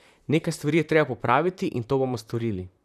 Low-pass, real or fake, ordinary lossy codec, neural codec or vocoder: 14.4 kHz; fake; none; vocoder, 44.1 kHz, 128 mel bands, Pupu-Vocoder